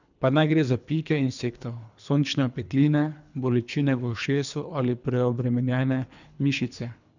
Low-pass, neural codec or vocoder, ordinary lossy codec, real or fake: 7.2 kHz; codec, 24 kHz, 3 kbps, HILCodec; none; fake